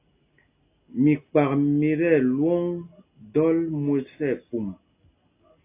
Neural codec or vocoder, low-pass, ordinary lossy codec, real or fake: none; 3.6 kHz; AAC, 24 kbps; real